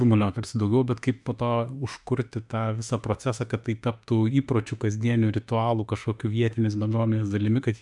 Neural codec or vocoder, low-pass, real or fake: autoencoder, 48 kHz, 32 numbers a frame, DAC-VAE, trained on Japanese speech; 10.8 kHz; fake